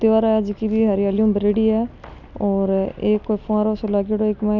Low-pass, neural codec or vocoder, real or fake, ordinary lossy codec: 7.2 kHz; none; real; none